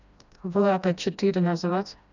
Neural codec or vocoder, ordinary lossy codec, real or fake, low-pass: codec, 16 kHz, 1 kbps, FreqCodec, smaller model; none; fake; 7.2 kHz